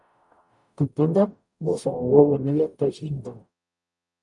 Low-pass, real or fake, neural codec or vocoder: 10.8 kHz; fake; codec, 44.1 kHz, 0.9 kbps, DAC